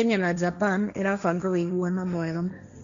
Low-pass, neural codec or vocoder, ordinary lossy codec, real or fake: 7.2 kHz; codec, 16 kHz, 1.1 kbps, Voila-Tokenizer; none; fake